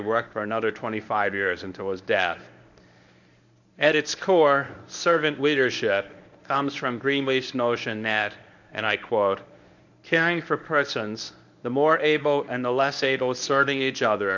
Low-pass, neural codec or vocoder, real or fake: 7.2 kHz; codec, 24 kHz, 0.9 kbps, WavTokenizer, medium speech release version 1; fake